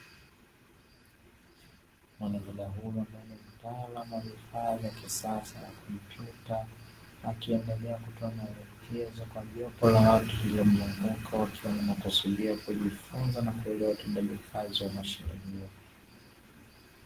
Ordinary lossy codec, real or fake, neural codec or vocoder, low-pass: Opus, 16 kbps; real; none; 14.4 kHz